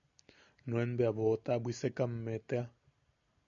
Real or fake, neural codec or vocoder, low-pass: real; none; 7.2 kHz